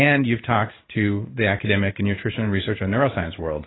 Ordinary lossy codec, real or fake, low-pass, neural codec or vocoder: AAC, 16 kbps; fake; 7.2 kHz; codec, 16 kHz in and 24 kHz out, 1 kbps, XY-Tokenizer